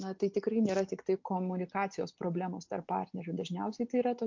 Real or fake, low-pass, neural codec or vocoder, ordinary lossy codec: real; 7.2 kHz; none; MP3, 48 kbps